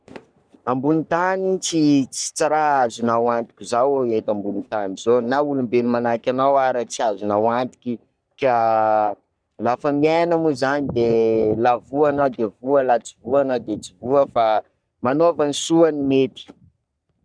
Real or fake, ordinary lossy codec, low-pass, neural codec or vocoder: fake; none; 9.9 kHz; codec, 44.1 kHz, 3.4 kbps, Pupu-Codec